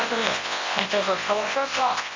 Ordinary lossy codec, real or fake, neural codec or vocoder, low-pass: MP3, 48 kbps; fake; codec, 24 kHz, 0.9 kbps, WavTokenizer, large speech release; 7.2 kHz